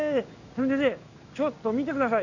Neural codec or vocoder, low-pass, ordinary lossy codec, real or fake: codec, 16 kHz in and 24 kHz out, 1 kbps, XY-Tokenizer; 7.2 kHz; none; fake